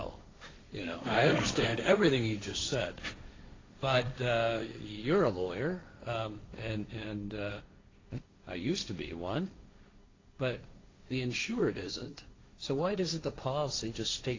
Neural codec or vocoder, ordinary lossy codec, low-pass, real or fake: codec, 16 kHz, 1.1 kbps, Voila-Tokenizer; AAC, 32 kbps; 7.2 kHz; fake